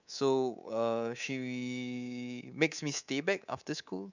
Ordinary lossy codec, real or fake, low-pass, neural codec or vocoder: none; fake; 7.2 kHz; codec, 24 kHz, 3.1 kbps, DualCodec